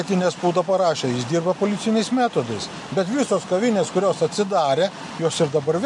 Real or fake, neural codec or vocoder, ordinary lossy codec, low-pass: real; none; MP3, 48 kbps; 10.8 kHz